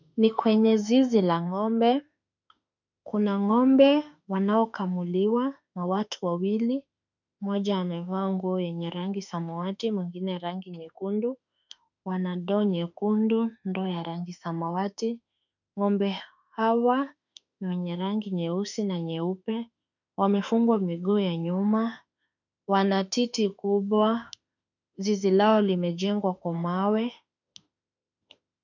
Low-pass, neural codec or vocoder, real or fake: 7.2 kHz; autoencoder, 48 kHz, 32 numbers a frame, DAC-VAE, trained on Japanese speech; fake